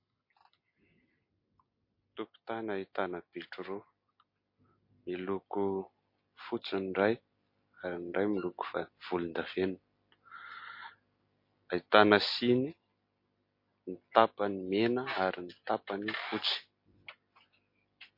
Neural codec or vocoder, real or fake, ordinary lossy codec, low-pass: none; real; MP3, 32 kbps; 5.4 kHz